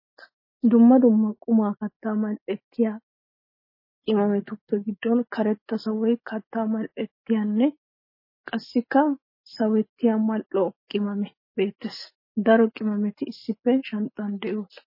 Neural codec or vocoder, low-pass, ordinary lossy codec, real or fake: none; 5.4 kHz; MP3, 24 kbps; real